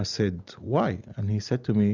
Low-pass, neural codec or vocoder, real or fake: 7.2 kHz; none; real